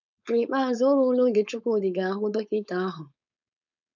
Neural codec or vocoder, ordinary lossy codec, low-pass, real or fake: codec, 16 kHz, 4.8 kbps, FACodec; none; 7.2 kHz; fake